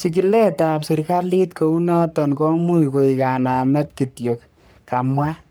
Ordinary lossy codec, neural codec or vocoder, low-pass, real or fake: none; codec, 44.1 kHz, 3.4 kbps, Pupu-Codec; none; fake